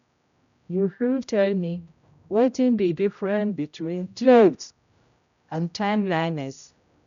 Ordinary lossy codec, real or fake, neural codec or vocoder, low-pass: none; fake; codec, 16 kHz, 0.5 kbps, X-Codec, HuBERT features, trained on general audio; 7.2 kHz